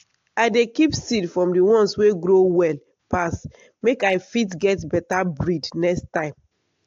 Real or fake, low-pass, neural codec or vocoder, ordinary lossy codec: real; 7.2 kHz; none; AAC, 48 kbps